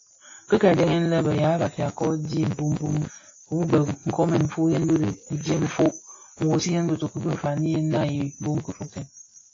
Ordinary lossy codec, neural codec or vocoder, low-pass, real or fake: AAC, 32 kbps; none; 7.2 kHz; real